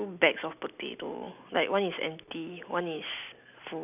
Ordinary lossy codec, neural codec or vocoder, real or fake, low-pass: none; none; real; 3.6 kHz